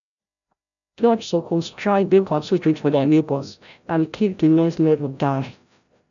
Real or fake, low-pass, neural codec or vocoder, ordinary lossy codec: fake; 7.2 kHz; codec, 16 kHz, 0.5 kbps, FreqCodec, larger model; none